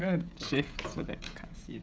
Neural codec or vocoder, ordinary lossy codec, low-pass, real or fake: codec, 16 kHz, 16 kbps, FreqCodec, smaller model; none; none; fake